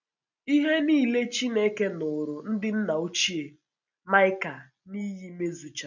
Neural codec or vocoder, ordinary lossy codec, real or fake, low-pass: none; none; real; 7.2 kHz